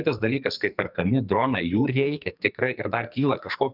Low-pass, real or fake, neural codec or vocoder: 5.4 kHz; fake; codec, 44.1 kHz, 2.6 kbps, SNAC